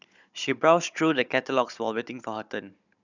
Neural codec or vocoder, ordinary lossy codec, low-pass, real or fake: codec, 16 kHz, 16 kbps, FunCodec, trained on Chinese and English, 50 frames a second; none; 7.2 kHz; fake